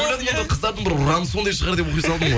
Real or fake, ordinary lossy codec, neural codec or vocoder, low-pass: real; none; none; none